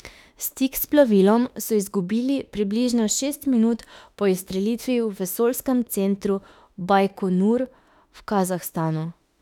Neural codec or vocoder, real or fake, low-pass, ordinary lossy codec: autoencoder, 48 kHz, 32 numbers a frame, DAC-VAE, trained on Japanese speech; fake; 19.8 kHz; none